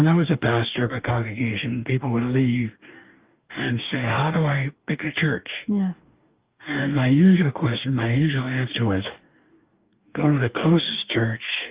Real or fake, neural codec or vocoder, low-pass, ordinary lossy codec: fake; codec, 44.1 kHz, 2.6 kbps, DAC; 3.6 kHz; Opus, 32 kbps